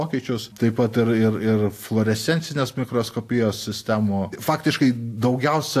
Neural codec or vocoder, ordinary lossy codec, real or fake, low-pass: none; AAC, 64 kbps; real; 14.4 kHz